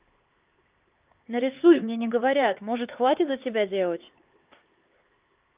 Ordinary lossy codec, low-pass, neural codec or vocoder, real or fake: Opus, 24 kbps; 3.6 kHz; codec, 16 kHz, 4 kbps, X-Codec, HuBERT features, trained on LibriSpeech; fake